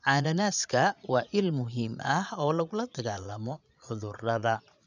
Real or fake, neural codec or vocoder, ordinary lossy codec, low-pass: fake; vocoder, 22.05 kHz, 80 mel bands, Vocos; none; 7.2 kHz